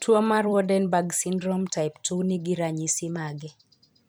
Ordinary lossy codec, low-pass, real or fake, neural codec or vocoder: none; none; fake; vocoder, 44.1 kHz, 128 mel bands every 256 samples, BigVGAN v2